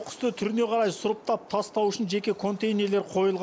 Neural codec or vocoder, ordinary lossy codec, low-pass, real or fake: none; none; none; real